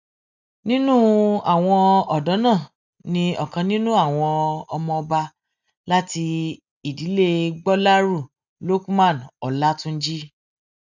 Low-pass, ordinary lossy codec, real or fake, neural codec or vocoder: 7.2 kHz; none; real; none